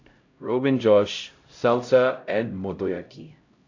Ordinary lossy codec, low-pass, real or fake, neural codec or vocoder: AAC, 48 kbps; 7.2 kHz; fake; codec, 16 kHz, 0.5 kbps, X-Codec, HuBERT features, trained on LibriSpeech